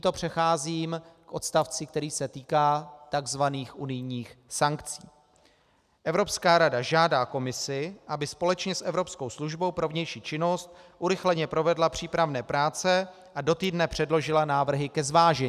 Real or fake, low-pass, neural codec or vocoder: real; 14.4 kHz; none